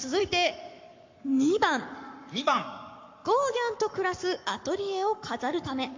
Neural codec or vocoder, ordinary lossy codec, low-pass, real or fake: codec, 44.1 kHz, 7.8 kbps, Pupu-Codec; MP3, 64 kbps; 7.2 kHz; fake